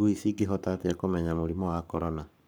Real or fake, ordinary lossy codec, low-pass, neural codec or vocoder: fake; none; none; codec, 44.1 kHz, 7.8 kbps, Pupu-Codec